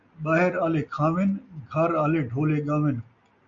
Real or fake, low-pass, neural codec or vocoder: real; 7.2 kHz; none